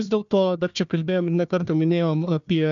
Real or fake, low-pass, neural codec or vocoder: fake; 7.2 kHz; codec, 16 kHz, 1 kbps, FunCodec, trained on Chinese and English, 50 frames a second